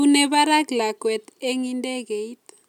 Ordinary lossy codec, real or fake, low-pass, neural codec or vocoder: none; real; 19.8 kHz; none